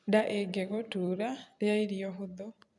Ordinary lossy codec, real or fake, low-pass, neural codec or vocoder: none; fake; 10.8 kHz; vocoder, 44.1 kHz, 128 mel bands every 256 samples, BigVGAN v2